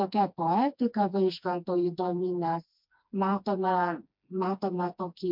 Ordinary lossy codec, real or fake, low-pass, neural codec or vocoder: MP3, 48 kbps; fake; 5.4 kHz; codec, 16 kHz, 2 kbps, FreqCodec, smaller model